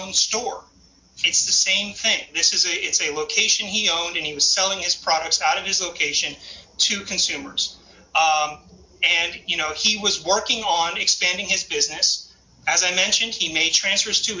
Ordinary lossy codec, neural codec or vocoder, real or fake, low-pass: MP3, 48 kbps; none; real; 7.2 kHz